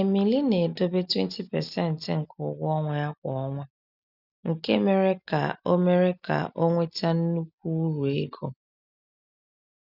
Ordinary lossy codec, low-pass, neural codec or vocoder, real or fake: none; 5.4 kHz; none; real